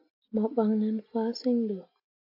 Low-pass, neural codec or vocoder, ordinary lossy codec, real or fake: 5.4 kHz; none; AAC, 48 kbps; real